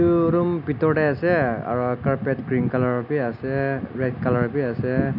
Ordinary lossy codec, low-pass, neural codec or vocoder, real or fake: none; 5.4 kHz; none; real